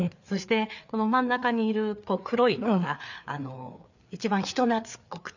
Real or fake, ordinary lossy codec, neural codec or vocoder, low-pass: fake; none; codec, 16 kHz, 4 kbps, FreqCodec, larger model; 7.2 kHz